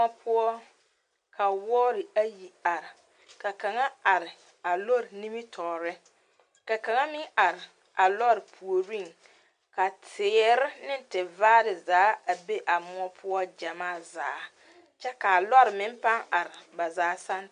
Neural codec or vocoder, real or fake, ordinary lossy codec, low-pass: none; real; MP3, 64 kbps; 9.9 kHz